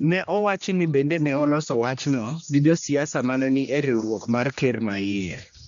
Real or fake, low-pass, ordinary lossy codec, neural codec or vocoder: fake; 7.2 kHz; none; codec, 16 kHz, 1 kbps, X-Codec, HuBERT features, trained on general audio